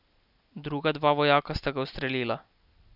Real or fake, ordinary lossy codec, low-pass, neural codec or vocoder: real; none; 5.4 kHz; none